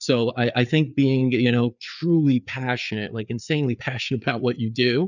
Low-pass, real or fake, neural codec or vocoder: 7.2 kHz; fake; codec, 16 kHz, 4 kbps, FreqCodec, larger model